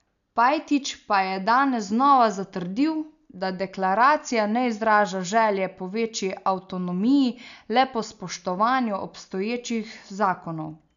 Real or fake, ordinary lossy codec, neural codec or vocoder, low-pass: real; MP3, 96 kbps; none; 7.2 kHz